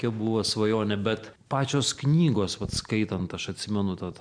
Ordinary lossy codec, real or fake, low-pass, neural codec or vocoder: MP3, 96 kbps; real; 9.9 kHz; none